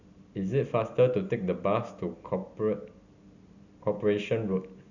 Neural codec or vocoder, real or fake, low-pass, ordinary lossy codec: none; real; 7.2 kHz; none